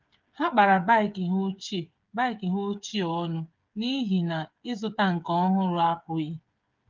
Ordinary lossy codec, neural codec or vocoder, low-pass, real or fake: Opus, 32 kbps; codec, 16 kHz, 8 kbps, FreqCodec, smaller model; 7.2 kHz; fake